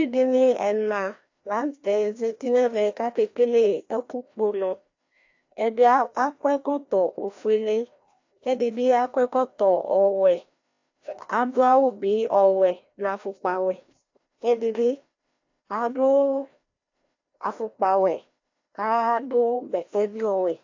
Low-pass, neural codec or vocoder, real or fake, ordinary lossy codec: 7.2 kHz; codec, 16 kHz, 1 kbps, FreqCodec, larger model; fake; AAC, 48 kbps